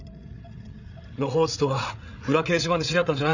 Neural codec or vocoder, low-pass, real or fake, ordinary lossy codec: codec, 16 kHz, 8 kbps, FreqCodec, larger model; 7.2 kHz; fake; none